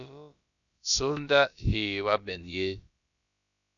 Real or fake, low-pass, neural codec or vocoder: fake; 7.2 kHz; codec, 16 kHz, about 1 kbps, DyCAST, with the encoder's durations